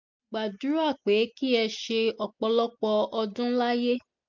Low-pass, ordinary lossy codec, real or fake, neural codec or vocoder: 7.2 kHz; MP3, 48 kbps; real; none